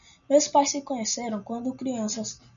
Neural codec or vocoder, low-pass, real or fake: none; 7.2 kHz; real